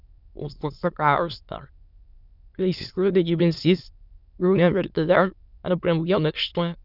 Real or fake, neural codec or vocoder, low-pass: fake; autoencoder, 22.05 kHz, a latent of 192 numbers a frame, VITS, trained on many speakers; 5.4 kHz